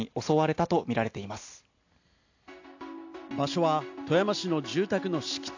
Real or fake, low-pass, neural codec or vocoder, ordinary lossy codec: real; 7.2 kHz; none; AAC, 48 kbps